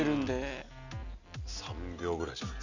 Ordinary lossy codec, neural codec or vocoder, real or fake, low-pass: none; none; real; 7.2 kHz